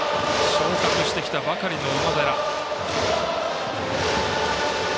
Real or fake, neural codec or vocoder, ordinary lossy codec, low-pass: real; none; none; none